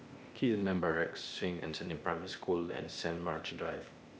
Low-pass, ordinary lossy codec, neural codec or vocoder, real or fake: none; none; codec, 16 kHz, 0.8 kbps, ZipCodec; fake